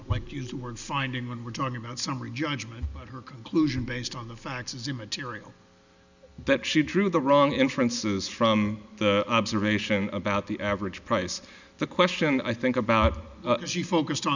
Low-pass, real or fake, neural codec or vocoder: 7.2 kHz; real; none